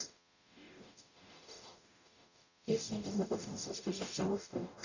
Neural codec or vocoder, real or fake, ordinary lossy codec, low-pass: codec, 44.1 kHz, 0.9 kbps, DAC; fake; none; 7.2 kHz